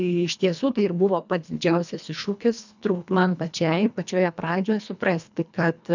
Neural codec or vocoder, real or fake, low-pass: codec, 24 kHz, 1.5 kbps, HILCodec; fake; 7.2 kHz